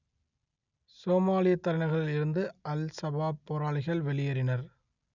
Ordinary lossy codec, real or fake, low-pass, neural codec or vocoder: none; real; 7.2 kHz; none